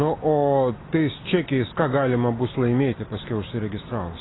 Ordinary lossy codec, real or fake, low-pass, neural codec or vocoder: AAC, 16 kbps; real; 7.2 kHz; none